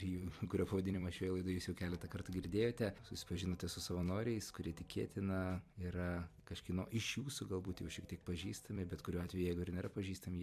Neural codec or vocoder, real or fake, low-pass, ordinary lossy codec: vocoder, 44.1 kHz, 128 mel bands every 256 samples, BigVGAN v2; fake; 14.4 kHz; MP3, 96 kbps